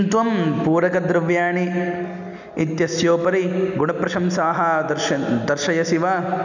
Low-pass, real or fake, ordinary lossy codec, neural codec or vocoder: 7.2 kHz; real; none; none